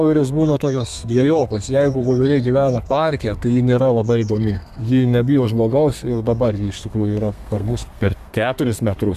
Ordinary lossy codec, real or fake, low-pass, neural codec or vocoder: MP3, 96 kbps; fake; 14.4 kHz; codec, 32 kHz, 1.9 kbps, SNAC